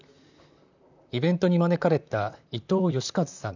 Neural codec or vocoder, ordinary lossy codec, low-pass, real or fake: vocoder, 44.1 kHz, 128 mel bands, Pupu-Vocoder; none; 7.2 kHz; fake